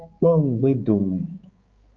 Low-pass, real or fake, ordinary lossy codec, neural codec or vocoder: 7.2 kHz; fake; Opus, 24 kbps; codec, 16 kHz, 4 kbps, X-Codec, HuBERT features, trained on balanced general audio